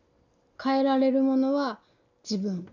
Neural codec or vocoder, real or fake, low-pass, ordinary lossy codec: none; real; 7.2 kHz; none